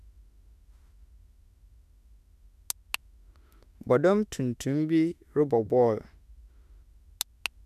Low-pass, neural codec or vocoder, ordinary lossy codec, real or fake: 14.4 kHz; autoencoder, 48 kHz, 32 numbers a frame, DAC-VAE, trained on Japanese speech; none; fake